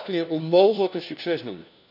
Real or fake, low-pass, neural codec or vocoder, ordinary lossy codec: fake; 5.4 kHz; codec, 16 kHz, 1 kbps, FunCodec, trained on LibriTTS, 50 frames a second; none